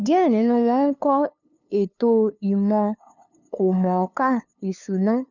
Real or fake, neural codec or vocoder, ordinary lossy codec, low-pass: fake; codec, 16 kHz, 2 kbps, FunCodec, trained on LibriTTS, 25 frames a second; none; 7.2 kHz